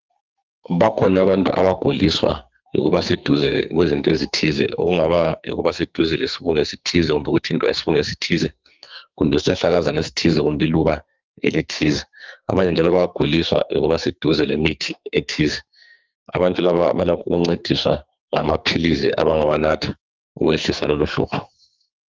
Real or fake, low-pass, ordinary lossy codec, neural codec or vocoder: fake; 7.2 kHz; Opus, 32 kbps; codec, 44.1 kHz, 2.6 kbps, SNAC